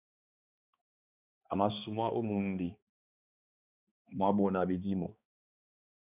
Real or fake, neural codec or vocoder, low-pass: fake; codec, 16 kHz, 2 kbps, X-Codec, HuBERT features, trained on balanced general audio; 3.6 kHz